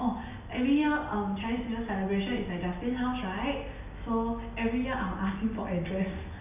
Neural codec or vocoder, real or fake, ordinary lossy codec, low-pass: none; real; none; 3.6 kHz